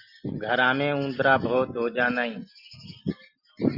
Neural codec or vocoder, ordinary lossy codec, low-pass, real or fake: none; Opus, 64 kbps; 5.4 kHz; real